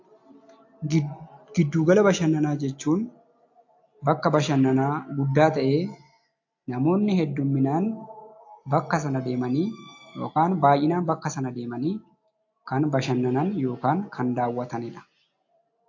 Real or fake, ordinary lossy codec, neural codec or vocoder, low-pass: real; AAC, 48 kbps; none; 7.2 kHz